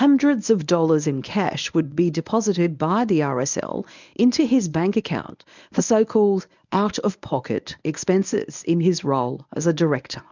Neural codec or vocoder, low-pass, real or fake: codec, 24 kHz, 0.9 kbps, WavTokenizer, medium speech release version 1; 7.2 kHz; fake